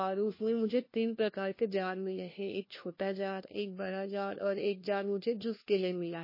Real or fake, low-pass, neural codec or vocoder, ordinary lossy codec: fake; 5.4 kHz; codec, 16 kHz, 1 kbps, FunCodec, trained on LibriTTS, 50 frames a second; MP3, 24 kbps